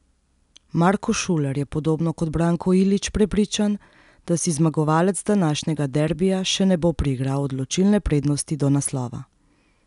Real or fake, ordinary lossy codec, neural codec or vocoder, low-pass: real; none; none; 10.8 kHz